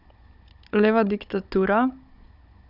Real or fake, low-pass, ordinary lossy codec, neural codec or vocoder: fake; 5.4 kHz; none; codec, 16 kHz, 16 kbps, FunCodec, trained on Chinese and English, 50 frames a second